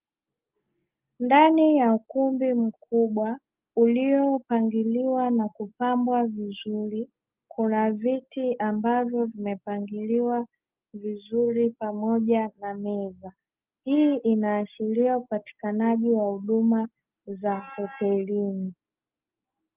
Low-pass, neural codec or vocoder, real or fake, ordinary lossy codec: 3.6 kHz; none; real; Opus, 24 kbps